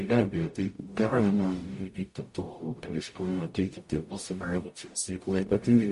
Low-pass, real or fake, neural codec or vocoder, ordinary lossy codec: 14.4 kHz; fake; codec, 44.1 kHz, 0.9 kbps, DAC; MP3, 48 kbps